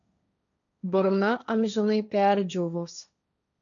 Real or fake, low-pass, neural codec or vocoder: fake; 7.2 kHz; codec, 16 kHz, 1.1 kbps, Voila-Tokenizer